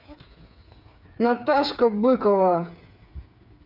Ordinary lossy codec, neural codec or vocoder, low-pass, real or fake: none; codec, 16 kHz, 8 kbps, FreqCodec, smaller model; 5.4 kHz; fake